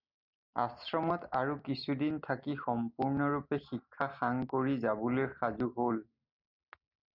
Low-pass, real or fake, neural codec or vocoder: 5.4 kHz; real; none